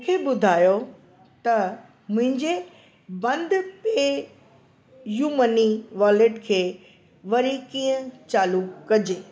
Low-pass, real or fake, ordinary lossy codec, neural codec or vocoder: none; real; none; none